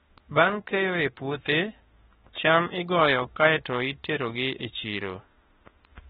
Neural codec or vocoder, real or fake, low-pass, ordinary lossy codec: autoencoder, 48 kHz, 32 numbers a frame, DAC-VAE, trained on Japanese speech; fake; 19.8 kHz; AAC, 16 kbps